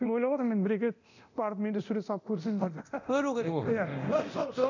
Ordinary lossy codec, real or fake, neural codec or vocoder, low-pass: none; fake; codec, 24 kHz, 0.9 kbps, DualCodec; 7.2 kHz